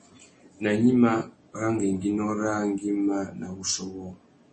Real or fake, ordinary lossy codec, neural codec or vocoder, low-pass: real; MP3, 32 kbps; none; 10.8 kHz